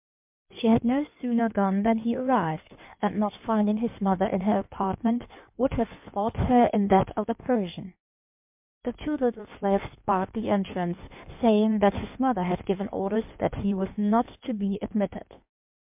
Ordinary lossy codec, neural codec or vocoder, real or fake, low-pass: MP3, 32 kbps; codec, 16 kHz in and 24 kHz out, 1.1 kbps, FireRedTTS-2 codec; fake; 3.6 kHz